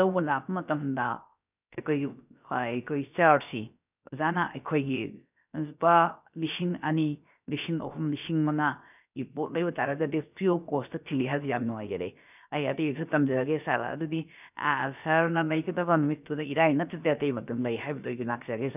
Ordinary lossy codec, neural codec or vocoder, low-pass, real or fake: none; codec, 16 kHz, about 1 kbps, DyCAST, with the encoder's durations; 3.6 kHz; fake